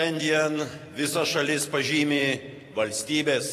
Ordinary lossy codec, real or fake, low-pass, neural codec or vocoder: AAC, 48 kbps; fake; 14.4 kHz; vocoder, 44.1 kHz, 128 mel bands every 512 samples, BigVGAN v2